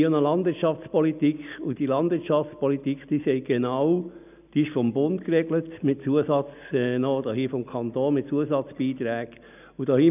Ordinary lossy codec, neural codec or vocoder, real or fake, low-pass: none; none; real; 3.6 kHz